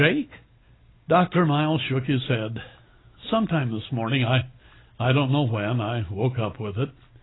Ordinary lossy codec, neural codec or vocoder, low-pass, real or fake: AAC, 16 kbps; none; 7.2 kHz; real